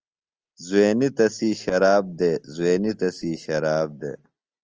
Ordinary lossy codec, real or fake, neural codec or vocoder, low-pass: Opus, 24 kbps; real; none; 7.2 kHz